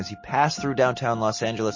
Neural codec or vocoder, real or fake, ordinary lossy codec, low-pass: none; real; MP3, 32 kbps; 7.2 kHz